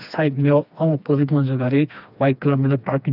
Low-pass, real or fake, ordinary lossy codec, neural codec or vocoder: 5.4 kHz; fake; none; codec, 16 kHz, 2 kbps, FreqCodec, smaller model